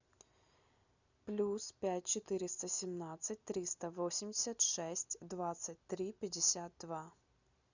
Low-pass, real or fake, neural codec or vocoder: 7.2 kHz; real; none